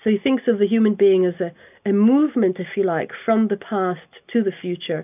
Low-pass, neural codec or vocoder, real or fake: 3.6 kHz; none; real